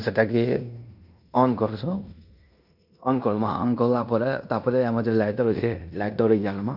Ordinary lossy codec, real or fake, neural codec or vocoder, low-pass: none; fake; codec, 16 kHz in and 24 kHz out, 0.9 kbps, LongCat-Audio-Codec, fine tuned four codebook decoder; 5.4 kHz